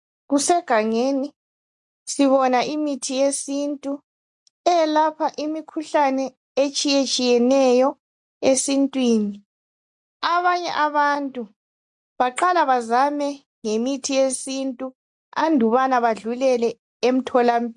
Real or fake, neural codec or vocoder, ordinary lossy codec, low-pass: real; none; MP3, 64 kbps; 10.8 kHz